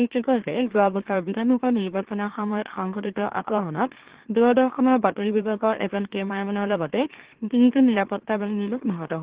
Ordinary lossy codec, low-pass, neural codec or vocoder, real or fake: Opus, 16 kbps; 3.6 kHz; autoencoder, 44.1 kHz, a latent of 192 numbers a frame, MeloTTS; fake